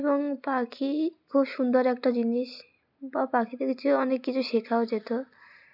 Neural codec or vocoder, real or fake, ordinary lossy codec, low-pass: none; real; none; 5.4 kHz